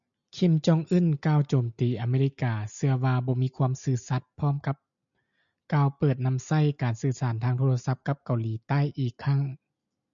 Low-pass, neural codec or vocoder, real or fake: 7.2 kHz; none; real